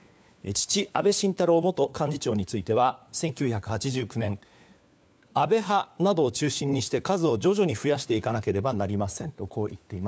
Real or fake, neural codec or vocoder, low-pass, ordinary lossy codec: fake; codec, 16 kHz, 4 kbps, FunCodec, trained on LibriTTS, 50 frames a second; none; none